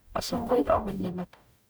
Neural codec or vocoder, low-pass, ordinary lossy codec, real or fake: codec, 44.1 kHz, 0.9 kbps, DAC; none; none; fake